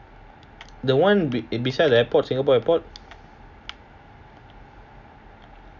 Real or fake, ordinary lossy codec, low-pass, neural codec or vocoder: real; none; 7.2 kHz; none